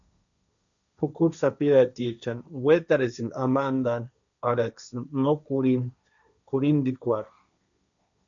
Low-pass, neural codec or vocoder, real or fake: 7.2 kHz; codec, 16 kHz, 1.1 kbps, Voila-Tokenizer; fake